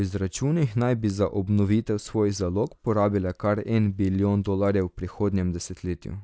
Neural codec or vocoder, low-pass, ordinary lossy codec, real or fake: none; none; none; real